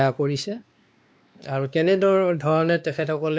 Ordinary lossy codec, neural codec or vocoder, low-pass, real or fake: none; codec, 16 kHz, 2 kbps, X-Codec, WavLM features, trained on Multilingual LibriSpeech; none; fake